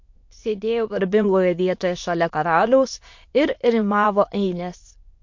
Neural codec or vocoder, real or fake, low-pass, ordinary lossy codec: autoencoder, 22.05 kHz, a latent of 192 numbers a frame, VITS, trained on many speakers; fake; 7.2 kHz; MP3, 48 kbps